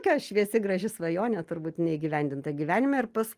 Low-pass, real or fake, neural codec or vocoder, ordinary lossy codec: 14.4 kHz; real; none; Opus, 24 kbps